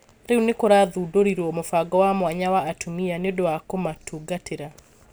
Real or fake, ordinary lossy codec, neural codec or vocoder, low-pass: real; none; none; none